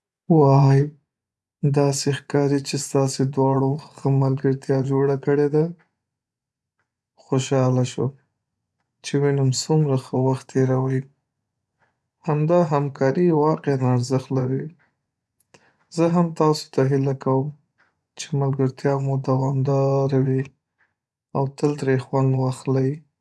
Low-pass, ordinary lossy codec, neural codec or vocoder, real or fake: none; none; vocoder, 24 kHz, 100 mel bands, Vocos; fake